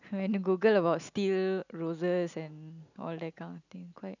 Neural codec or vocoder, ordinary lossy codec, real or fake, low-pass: none; none; real; 7.2 kHz